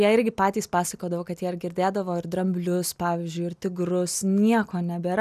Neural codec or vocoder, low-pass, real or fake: none; 14.4 kHz; real